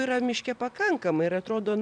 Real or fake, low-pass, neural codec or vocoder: fake; 9.9 kHz; vocoder, 44.1 kHz, 128 mel bands every 256 samples, BigVGAN v2